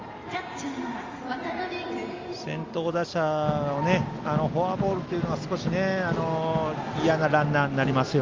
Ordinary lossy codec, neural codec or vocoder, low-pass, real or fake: Opus, 32 kbps; none; 7.2 kHz; real